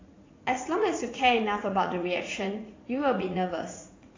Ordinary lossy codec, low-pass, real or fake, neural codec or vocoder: AAC, 32 kbps; 7.2 kHz; fake; vocoder, 44.1 kHz, 80 mel bands, Vocos